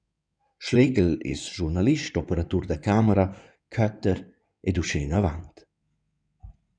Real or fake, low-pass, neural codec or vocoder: fake; 9.9 kHz; codec, 16 kHz in and 24 kHz out, 2.2 kbps, FireRedTTS-2 codec